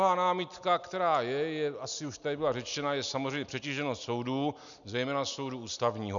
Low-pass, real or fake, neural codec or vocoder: 7.2 kHz; real; none